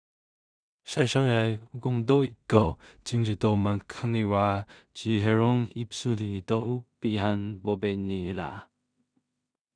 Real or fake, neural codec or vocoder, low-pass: fake; codec, 16 kHz in and 24 kHz out, 0.4 kbps, LongCat-Audio-Codec, two codebook decoder; 9.9 kHz